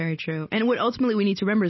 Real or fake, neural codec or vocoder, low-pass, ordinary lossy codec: real; none; 7.2 kHz; MP3, 24 kbps